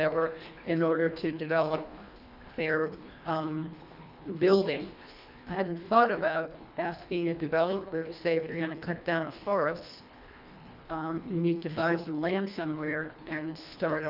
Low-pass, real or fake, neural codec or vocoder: 5.4 kHz; fake; codec, 24 kHz, 1.5 kbps, HILCodec